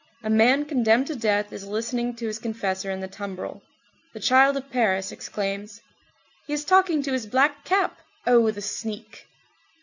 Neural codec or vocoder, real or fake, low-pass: none; real; 7.2 kHz